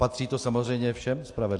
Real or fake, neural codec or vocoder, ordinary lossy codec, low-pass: real; none; AAC, 48 kbps; 10.8 kHz